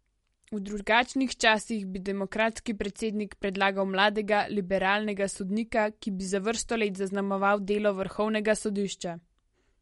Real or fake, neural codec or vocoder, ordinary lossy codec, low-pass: real; none; MP3, 48 kbps; 19.8 kHz